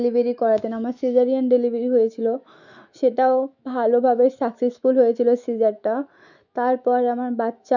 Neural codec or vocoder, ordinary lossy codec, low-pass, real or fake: autoencoder, 48 kHz, 128 numbers a frame, DAC-VAE, trained on Japanese speech; none; 7.2 kHz; fake